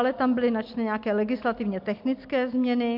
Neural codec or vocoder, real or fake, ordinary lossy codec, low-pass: none; real; MP3, 48 kbps; 5.4 kHz